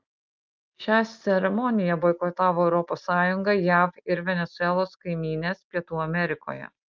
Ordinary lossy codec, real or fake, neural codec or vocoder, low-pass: Opus, 24 kbps; real; none; 7.2 kHz